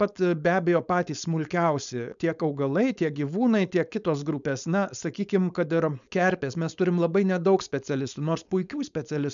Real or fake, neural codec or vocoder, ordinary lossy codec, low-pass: fake; codec, 16 kHz, 4.8 kbps, FACodec; MP3, 96 kbps; 7.2 kHz